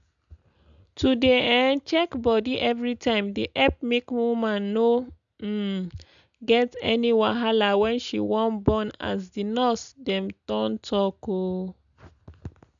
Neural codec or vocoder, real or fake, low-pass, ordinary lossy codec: none; real; 7.2 kHz; MP3, 96 kbps